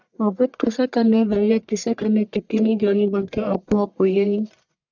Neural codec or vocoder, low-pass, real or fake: codec, 44.1 kHz, 1.7 kbps, Pupu-Codec; 7.2 kHz; fake